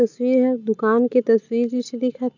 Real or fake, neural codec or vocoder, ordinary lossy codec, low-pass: real; none; none; 7.2 kHz